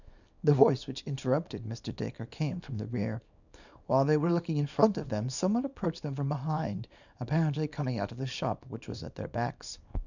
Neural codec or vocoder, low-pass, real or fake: codec, 24 kHz, 0.9 kbps, WavTokenizer, small release; 7.2 kHz; fake